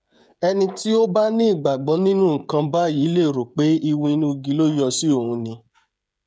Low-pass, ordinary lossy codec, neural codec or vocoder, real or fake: none; none; codec, 16 kHz, 16 kbps, FreqCodec, smaller model; fake